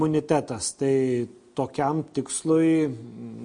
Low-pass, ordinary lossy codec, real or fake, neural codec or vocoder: 9.9 kHz; MP3, 48 kbps; real; none